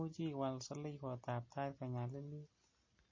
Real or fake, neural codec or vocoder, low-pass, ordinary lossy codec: real; none; 7.2 kHz; MP3, 32 kbps